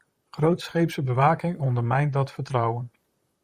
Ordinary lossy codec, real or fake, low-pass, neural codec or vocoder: Opus, 64 kbps; fake; 14.4 kHz; vocoder, 44.1 kHz, 128 mel bands, Pupu-Vocoder